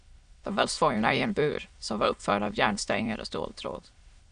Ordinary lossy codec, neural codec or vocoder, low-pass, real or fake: Opus, 64 kbps; autoencoder, 22.05 kHz, a latent of 192 numbers a frame, VITS, trained on many speakers; 9.9 kHz; fake